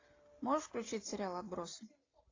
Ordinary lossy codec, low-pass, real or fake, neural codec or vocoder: AAC, 32 kbps; 7.2 kHz; real; none